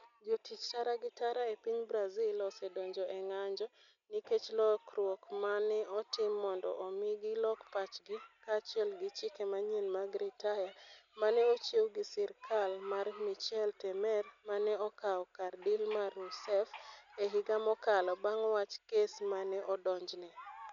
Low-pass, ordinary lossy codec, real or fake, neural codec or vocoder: 7.2 kHz; none; real; none